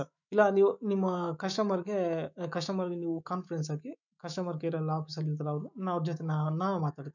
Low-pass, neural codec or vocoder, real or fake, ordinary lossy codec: 7.2 kHz; autoencoder, 48 kHz, 128 numbers a frame, DAC-VAE, trained on Japanese speech; fake; none